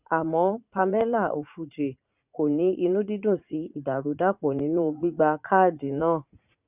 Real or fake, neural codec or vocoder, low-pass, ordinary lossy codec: fake; vocoder, 44.1 kHz, 80 mel bands, Vocos; 3.6 kHz; none